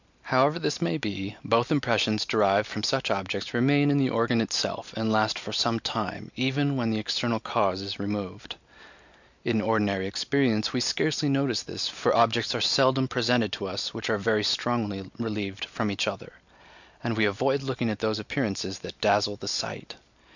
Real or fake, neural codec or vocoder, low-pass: real; none; 7.2 kHz